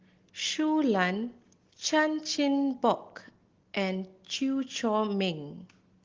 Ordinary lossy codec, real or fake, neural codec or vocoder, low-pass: Opus, 16 kbps; real; none; 7.2 kHz